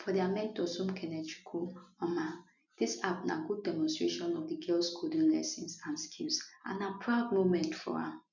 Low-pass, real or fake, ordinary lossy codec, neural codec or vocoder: 7.2 kHz; real; none; none